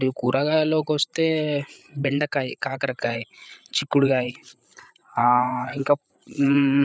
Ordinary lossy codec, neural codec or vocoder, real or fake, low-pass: none; codec, 16 kHz, 8 kbps, FreqCodec, larger model; fake; none